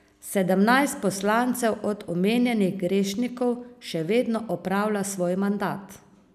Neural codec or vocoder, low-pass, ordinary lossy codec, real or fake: none; 14.4 kHz; none; real